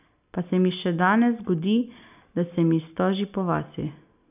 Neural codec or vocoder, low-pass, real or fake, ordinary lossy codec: none; 3.6 kHz; real; none